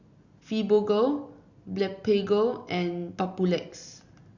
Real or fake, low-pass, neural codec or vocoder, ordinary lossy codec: real; 7.2 kHz; none; Opus, 64 kbps